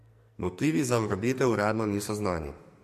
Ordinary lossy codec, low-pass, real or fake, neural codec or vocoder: MP3, 64 kbps; 14.4 kHz; fake; codec, 44.1 kHz, 2.6 kbps, SNAC